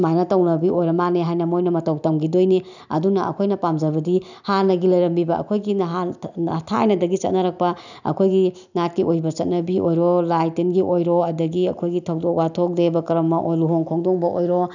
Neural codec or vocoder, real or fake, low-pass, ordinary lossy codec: none; real; 7.2 kHz; none